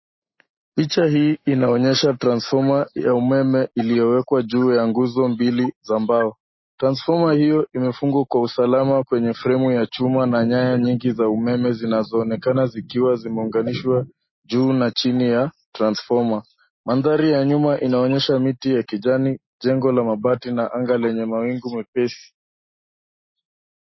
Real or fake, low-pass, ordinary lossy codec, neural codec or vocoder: real; 7.2 kHz; MP3, 24 kbps; none